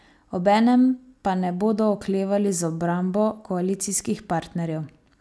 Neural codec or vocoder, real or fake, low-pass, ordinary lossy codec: none; real; none; none